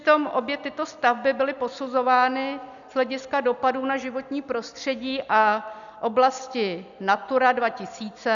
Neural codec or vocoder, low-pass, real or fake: none; 7.2 kHz; real